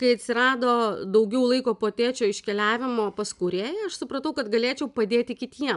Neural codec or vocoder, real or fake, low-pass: none; real; 10.8 kHz